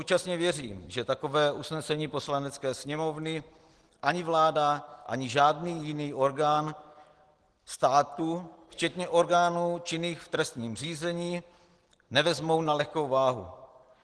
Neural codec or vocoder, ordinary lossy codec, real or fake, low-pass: none; Opus, 16 kbps; real; 10.8 kHz